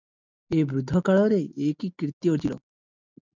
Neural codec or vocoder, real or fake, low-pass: none; real; 7.2 kHz